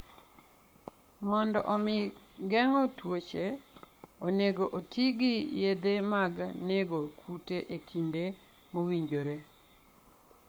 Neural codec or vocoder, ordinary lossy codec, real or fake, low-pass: codec, 44.1 kHz, 7.8 kbps, Pupu-Codec; none; fake; none